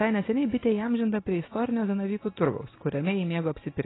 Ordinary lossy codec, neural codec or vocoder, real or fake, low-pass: AAC, 16 kbps; none; real; 7.2 kHz